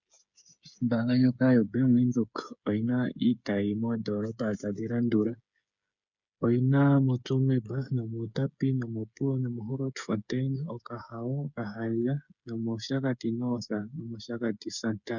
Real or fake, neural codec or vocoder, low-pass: fake; codec, 16 kHz, 8 kbps, FreqCodec, smaller model; 7.2 kHz